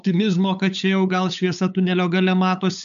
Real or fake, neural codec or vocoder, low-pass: fake; codec, 16 kHz, 8 kbps, FunCodec, trained on LibriTTS, 25 frames a second; 7.2 kHz